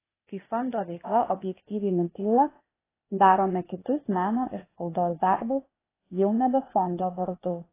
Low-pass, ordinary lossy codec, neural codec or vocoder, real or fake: 3.6 kHz; AAC, 16 kbps; codec, 16 kHz, 0.8 kbps, ZipCodec; fake